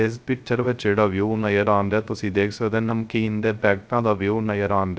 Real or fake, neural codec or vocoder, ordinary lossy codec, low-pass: fake; codec, 16 kHz, 0.3 kbps, FocalCodec; none; none